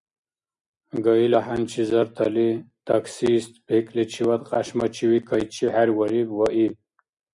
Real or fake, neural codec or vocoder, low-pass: real; none; 10.8 kHz